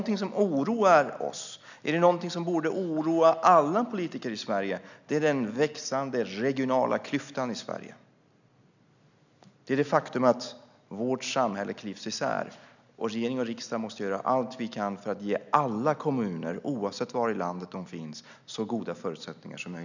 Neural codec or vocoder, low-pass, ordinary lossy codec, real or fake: none; 7.2 kHz; none; real